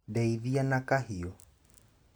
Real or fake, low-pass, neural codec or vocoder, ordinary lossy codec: real; none; none; none